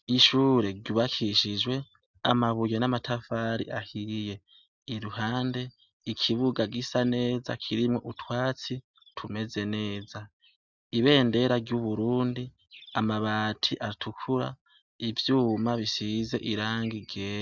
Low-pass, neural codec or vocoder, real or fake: 7.2 kHz; none; real